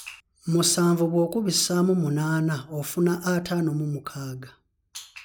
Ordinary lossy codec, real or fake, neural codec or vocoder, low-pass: none; real; none; none